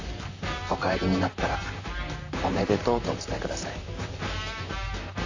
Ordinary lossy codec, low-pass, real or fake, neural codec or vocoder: AAC, 48 kbps; 7.2 kHz; fake; vocoder, 44.1 kHz, 128 mel bands, Pupu-Vocoder